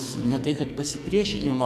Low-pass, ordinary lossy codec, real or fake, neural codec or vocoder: 14.4 kHz; AAC, 96 kbps; fake; codec, 44.1 kHz, 2.6 kbps, SNAC